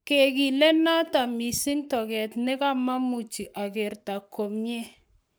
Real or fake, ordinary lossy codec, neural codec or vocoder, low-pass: fake; none; codec, 44.1 kHz, 7.8 kbps, Pupu-Codec; none